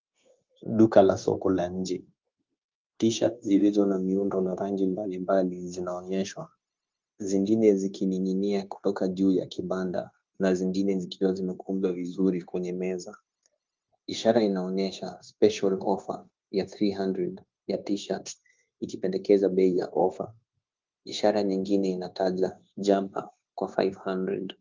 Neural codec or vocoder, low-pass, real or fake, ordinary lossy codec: codec, 16 kHz, 0.9 kbps, LongCat-Audio-Codec; 7.2 kHz; fake; Opus, 32 kbps